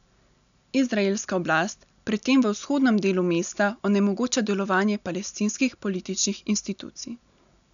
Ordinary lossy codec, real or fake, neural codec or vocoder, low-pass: none; real; none; 7.2 kHz